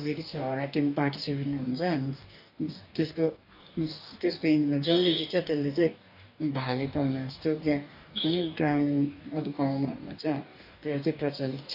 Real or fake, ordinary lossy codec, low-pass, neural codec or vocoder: fake; none; 5.4 kHz; codec, 44.1 kHz, 2.6 kbps, DAC